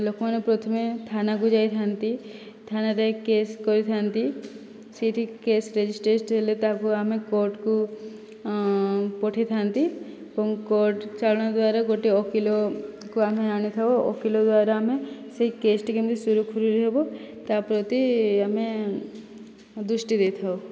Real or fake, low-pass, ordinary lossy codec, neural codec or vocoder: real; none; none; none